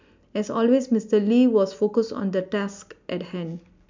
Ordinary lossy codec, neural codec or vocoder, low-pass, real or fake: MP3, 64 kbps; none; 7.2 kHz; real